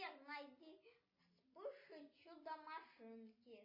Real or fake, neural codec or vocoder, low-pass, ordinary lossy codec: real; none; 5.4 kHz; MP3, 24 kbps